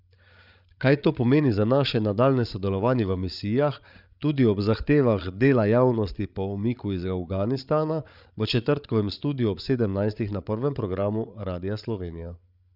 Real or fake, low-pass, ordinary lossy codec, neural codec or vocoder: fake; 5.4 kHz; none; codec, 16 kHz, 8 kbps, FreqCodec, larger model